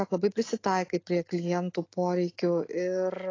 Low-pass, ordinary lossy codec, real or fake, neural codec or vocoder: 7.2 kHz; AAC, 32 kbps; real; none